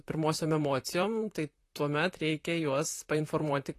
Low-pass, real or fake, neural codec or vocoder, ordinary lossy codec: 14.4 kHz; fake; vocoder, 44.1 kHz, 128 mel bands, Pupu-Vocoder; AAC, 48 kbps